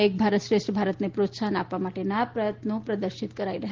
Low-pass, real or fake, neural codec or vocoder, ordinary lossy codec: 7.2 kHz; real; none; Opus, 16 kbps